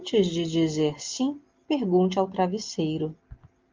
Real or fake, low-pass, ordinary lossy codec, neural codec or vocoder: real; 7.2 kHz; Opus, 32 kbps; none